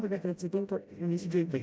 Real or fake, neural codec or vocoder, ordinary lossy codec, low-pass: fake; codec, 16 kHz, 0.5 kbps, FreqCodec, smaller model; none; none